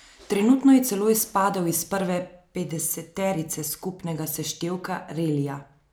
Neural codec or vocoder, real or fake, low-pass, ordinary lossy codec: none; real; none; none